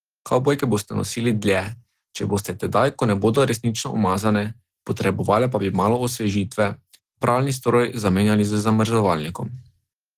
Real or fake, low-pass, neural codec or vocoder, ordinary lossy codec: real; 14.4 kHz; none; Opus, 16 kbps